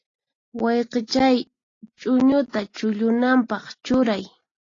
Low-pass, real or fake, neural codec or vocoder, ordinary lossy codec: 7.2 kHz; real; none; AAC, 32 kbps